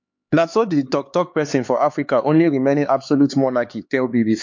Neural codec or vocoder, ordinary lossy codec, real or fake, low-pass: codec, 16 kHz, 4 kbps, X-Codec, HuBERT features, trained on LibriSpeech; MP3, 48 kbps; fake; 7.2 kHz